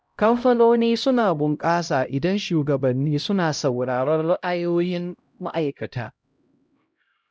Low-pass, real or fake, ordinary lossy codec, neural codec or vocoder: none; fake; none; codec, 16 kHz, 0.5 kbps, X-Codec, HuBERT features, trained on LibriSpeech